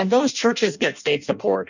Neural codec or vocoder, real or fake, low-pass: codec, 16 kHz in and 24 kHz out, 0.6 kbps, FireRedTTS-2 codec; fake; 7.2 kHz